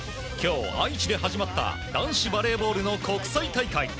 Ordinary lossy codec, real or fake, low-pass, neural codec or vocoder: none; real; none; none